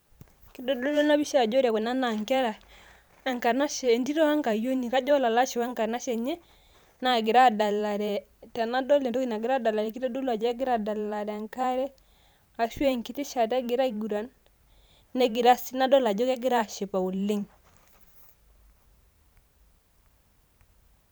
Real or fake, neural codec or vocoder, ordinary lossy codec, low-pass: fake; vocoder, 44.1 kHz, 128 mel bands every 512 samples, BigVGAN v2; none; none